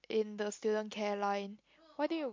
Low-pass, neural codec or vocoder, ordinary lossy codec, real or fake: 7.2 kHz; none; MP3, 48 kbps; real